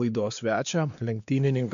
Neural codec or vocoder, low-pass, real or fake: codec, 16 kHz, 2 kbps, X-Codec, WavLM features, trained on Multilingual LibriSpeech; 7.2 kHz; fake